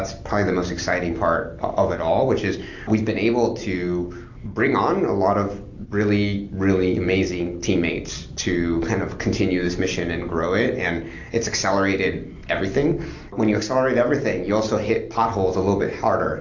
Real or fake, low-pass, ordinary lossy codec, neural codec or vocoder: real; 7.2 kHz; AAC, 48 kbps; none